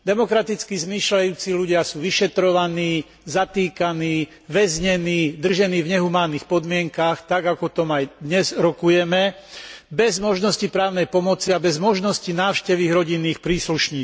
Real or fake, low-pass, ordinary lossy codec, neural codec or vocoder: real; none; none; none